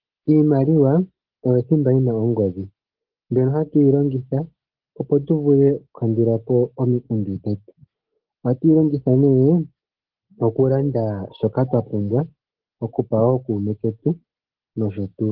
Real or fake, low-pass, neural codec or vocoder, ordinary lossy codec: real; 5.4 kHz; none; Opus, 16 kbps